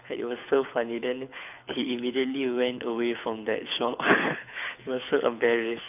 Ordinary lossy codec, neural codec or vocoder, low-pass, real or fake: none; codec, 24 kHz, 6 kbps, HILCodec; 3.6 kHz; fake